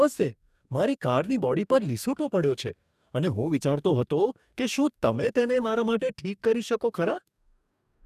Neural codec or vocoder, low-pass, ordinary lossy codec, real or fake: codec, 44.1 kHz, 2.6 kbps, DAC; 14.4 kHz; none; fake